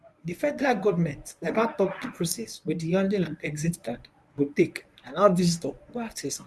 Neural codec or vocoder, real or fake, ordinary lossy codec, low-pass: codec, 24 kHz, 0.9 kbps, WavTokenizer, medium speech release version 1; fake; none; none